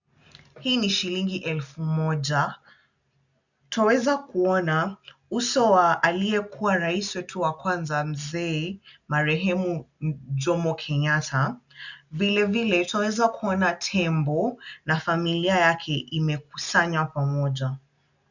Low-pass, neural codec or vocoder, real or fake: 7.2 kHz; none; real